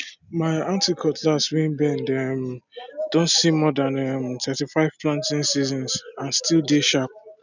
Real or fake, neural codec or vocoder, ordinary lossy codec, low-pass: real; none; none; 7.2 kHz